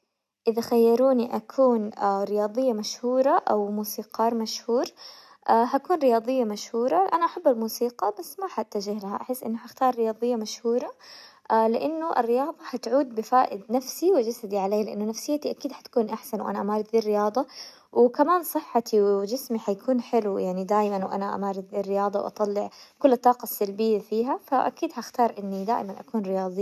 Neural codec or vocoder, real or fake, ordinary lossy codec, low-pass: none; real; none; 14.4 kHz